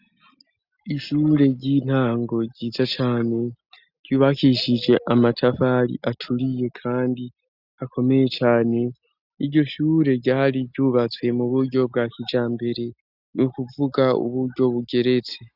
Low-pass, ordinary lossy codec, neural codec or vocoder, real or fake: 5.4 kHz; Opus, 64 kbps; none; real